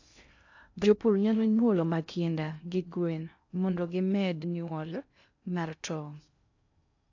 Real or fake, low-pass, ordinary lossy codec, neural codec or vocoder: fake; 7.2 kHz; AAC, 48 kbps; codec, 16 kHz in and 24 kHz out, 0.6 kbps, FocalCodec, streaming, 2048 codes